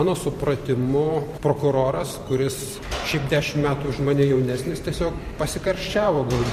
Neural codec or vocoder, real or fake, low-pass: vocoder, 44.1 kHz, 128 mel bands every 512 samples, BigVGAN v2; fake; 14.4 kHz